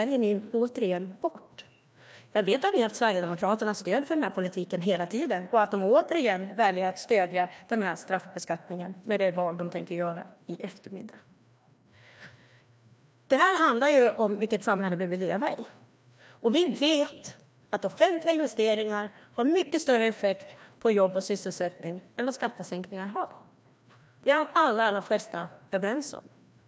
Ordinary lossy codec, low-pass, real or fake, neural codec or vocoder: none; none; fake; codec, 16 kHz, 1 kbps, FreqCodec, larger model